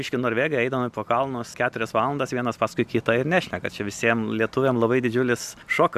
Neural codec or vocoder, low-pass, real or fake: none; 14.4 kHz; real